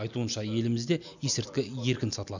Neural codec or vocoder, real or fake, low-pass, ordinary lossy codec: none; real; 7.2 kHz; none